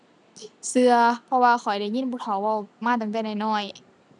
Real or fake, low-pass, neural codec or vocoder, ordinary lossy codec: real; 10.8 kHz; none; none